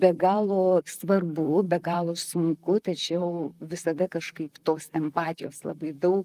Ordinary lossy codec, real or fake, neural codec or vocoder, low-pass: Opus, 32 kbps; fake; vocoder, 44.1 kHz, 128 mel bands, Pupu-Vocoder; 14.4 kHz